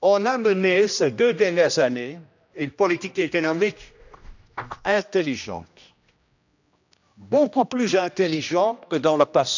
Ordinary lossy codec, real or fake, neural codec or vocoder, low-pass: none; fake; codec, 16 kHz, 1 kbps, X-Codec, HuBERT features, trained on general audio; 7.2 kHz